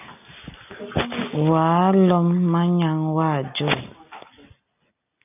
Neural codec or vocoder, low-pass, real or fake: none; 3.6 kHz; real